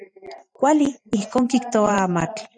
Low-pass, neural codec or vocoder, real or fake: 9.9 kHz; vocoder, 44.1 kHz, 128 mel bands every 512 samples, BigVGAN v2; fake